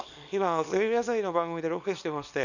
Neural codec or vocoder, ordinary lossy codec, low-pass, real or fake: codec, 24 kHz, 0.9 kbps, WavTokenizer, small release; none; 7.2 kHz; fake